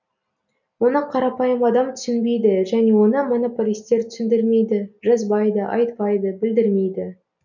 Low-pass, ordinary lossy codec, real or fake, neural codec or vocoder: 7.2 kHz; none; real; none